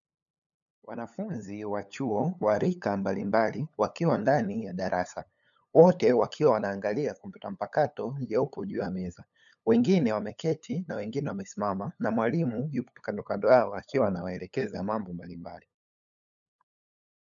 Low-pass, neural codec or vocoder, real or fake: 7.2 kHz; codec, 16 kHz, 8 kbps, FunCodec, trained on LibriTTS, 25 frames a second; fake